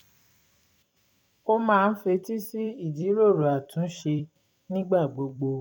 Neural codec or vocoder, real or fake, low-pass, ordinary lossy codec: vocoder, 44.1 kHz, 128 mel bands every 256 samples, BigVGAN v2; fake; 19.8 kHz; none